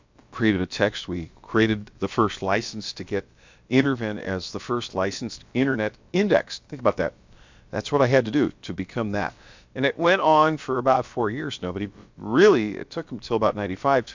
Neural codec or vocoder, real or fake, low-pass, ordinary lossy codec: codec, 16 kHz, about 1 kbps, DyCAST, with the encoder's durations; fake; 7.2 kHz; MP3, 64 kbps